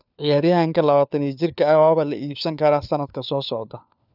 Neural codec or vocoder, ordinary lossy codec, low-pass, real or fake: codec, 16 kHz, 4 kbps, FunCodec, trained on LibriTTS, 50 frames a second; none; 5.4 kHz; fake